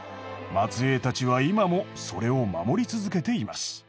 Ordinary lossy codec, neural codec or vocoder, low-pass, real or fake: none; none; none; real